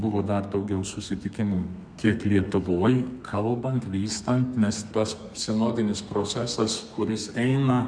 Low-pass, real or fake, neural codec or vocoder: 9.9 kHz; fake; codec, 32 kHz, 1.9 kbps, SNAC